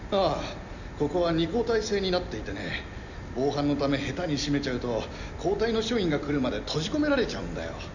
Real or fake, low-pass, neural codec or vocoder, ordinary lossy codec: real; 7.2 kHz; none; none